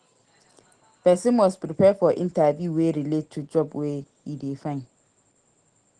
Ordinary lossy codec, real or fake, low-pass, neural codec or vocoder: Opus, 16 kbps; real; 9.9 kHz; none